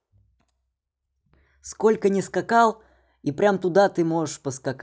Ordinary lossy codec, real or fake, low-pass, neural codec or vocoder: none; real; none; none